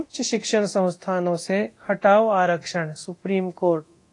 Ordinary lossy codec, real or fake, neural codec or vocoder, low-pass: AAC, 48 kbps; fake; codec, 24 kHz, 0.9 kbps, DualCodec; 10.8 kHz